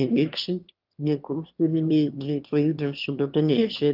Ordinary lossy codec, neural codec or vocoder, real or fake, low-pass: Opus, 32 kbps; autoencoder, 22.05 kHz, a latent of 192 numbers a frame, VITS, trained on one speaker; fake; 5.4 kHz